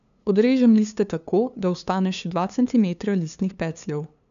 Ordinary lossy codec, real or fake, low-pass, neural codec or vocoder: none; fake; 7.2 kHz; codec, 16 kHz, 2 kbps, FunCodec, trained on LibriTTS, 25 frames a second